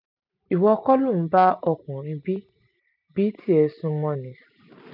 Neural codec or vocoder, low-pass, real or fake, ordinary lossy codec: none; 5.4 kHz; real; none